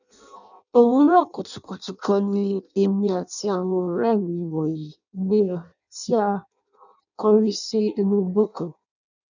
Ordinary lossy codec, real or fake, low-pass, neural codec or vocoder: none; fake; 7.2 kHz; codec, 16 kHz in and 24 kHz out, 0.6 kbps, FireRedTTS-2 codec